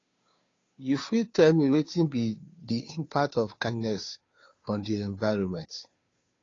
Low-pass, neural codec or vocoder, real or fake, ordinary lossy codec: 7.2 kHz; codec, 16 kHz, 2 kbps, FunCodec, trained on Chinese and English, 25 frames a second; fake; AAC, 32 kbps